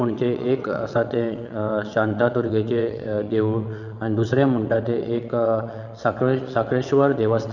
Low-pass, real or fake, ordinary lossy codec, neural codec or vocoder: 7.2 kHz; fake; none; vocoder, 22.05 kHz, 80 mel bands, WaveNeXt